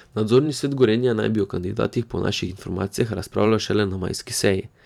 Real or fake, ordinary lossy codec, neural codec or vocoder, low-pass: fake; none; vocoder, 44.1 kHz, 128 mel bands every 512 samples, BigVGAN v2; 19.8 kHz